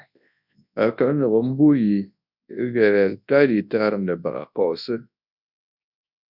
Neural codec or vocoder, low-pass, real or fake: codec, 24 kHz, 0.9 kbps, WavTokenizer, large speech release; 5.4 kHz; fake